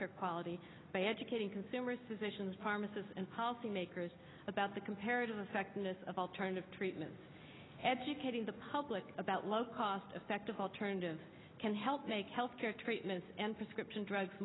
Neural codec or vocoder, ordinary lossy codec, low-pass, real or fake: none; AAC, 16 kbps; 7.2 kHz; real